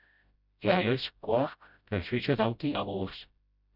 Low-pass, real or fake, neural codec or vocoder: 5.4 kHz; fake; codec, 16 kHz, 0.5 kbps, FreqCodec, smaller model